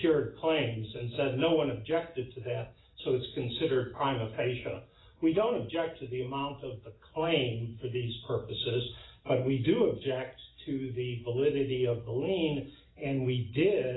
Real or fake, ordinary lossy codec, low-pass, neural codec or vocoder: real; AAC, 16 kbps; 7.2 kHz; none